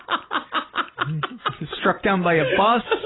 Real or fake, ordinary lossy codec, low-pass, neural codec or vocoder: real; AAC, 16 kbps; 7.2 kHz; none